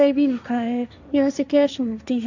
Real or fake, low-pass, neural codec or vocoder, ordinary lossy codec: fake; 7.2 kHz; codec, 16 kHz, 1.1 kbps, Voila-Tokenizer; none